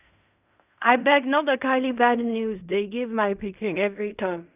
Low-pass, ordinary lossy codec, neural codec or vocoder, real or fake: 3.6 kHz; none; codec, 16 kHz in and 24 kHz out, 0.4 kbps, LongCat-Audio-Codec, fine tuned four codebook decoder; fake